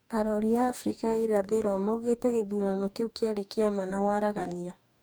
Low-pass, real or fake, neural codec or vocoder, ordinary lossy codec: none; fake; codec, 44.1 kHz, 2.6 kbps, DAC; none